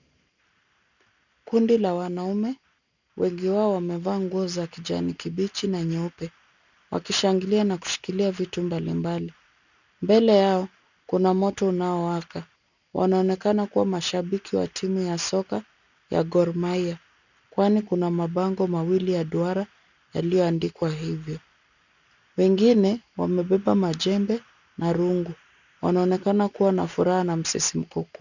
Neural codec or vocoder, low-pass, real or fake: none; 7.2 kHz; real